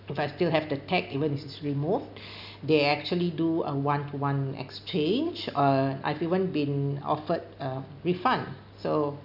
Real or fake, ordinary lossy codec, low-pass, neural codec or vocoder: real; none; 5.4 kHz; none